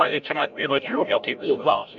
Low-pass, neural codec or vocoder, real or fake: 7.2 kHz; codec, 16 kHz, 0.5 kbps, FreqCodec, larger model; fake